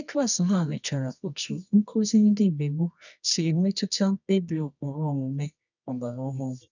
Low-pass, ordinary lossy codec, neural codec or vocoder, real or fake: 7.2 kHz; none; codec, 24 kHz, 0.9 kbps, WavTokenizer, medium music audio release; fake